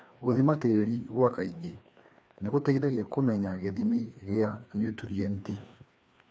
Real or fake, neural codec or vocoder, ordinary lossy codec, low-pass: fake; codec, 16 kHz, 2 kbps, FreqCodec, larger model; none; none